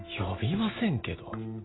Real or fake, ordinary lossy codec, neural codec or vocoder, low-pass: real; AAC, 16 kbps; none; 7.2 kHz